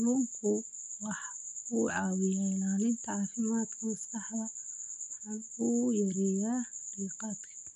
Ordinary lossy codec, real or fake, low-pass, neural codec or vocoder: none; real; 10.8 kHz; none